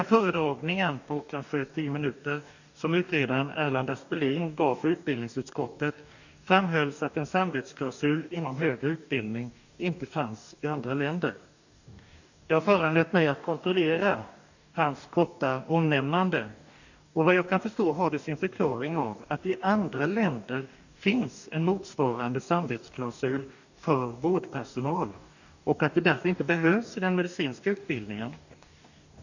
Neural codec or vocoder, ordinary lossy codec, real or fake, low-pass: codec, 44.1 kHz, 2.6 kbps, DAC; none; fake; 7.2 kHz